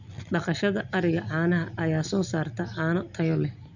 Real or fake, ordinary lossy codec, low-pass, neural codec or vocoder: real; none; 7.2 kHz; none